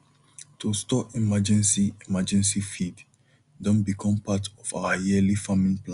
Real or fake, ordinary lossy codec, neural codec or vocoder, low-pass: real; Opus, 64 kbps; none; 10.8 kHz